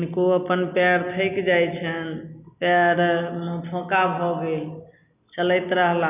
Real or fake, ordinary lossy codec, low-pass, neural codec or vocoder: real; none; 3.6 kHz; none